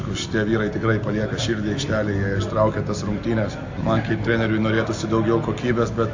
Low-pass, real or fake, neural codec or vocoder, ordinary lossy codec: 7.2 kHz; real; none; AAC, 48 kbps